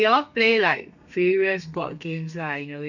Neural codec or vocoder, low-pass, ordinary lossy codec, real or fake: codec, 32 kHz, 1.9 kbps, SNAC; 7.2 kHz; none; fake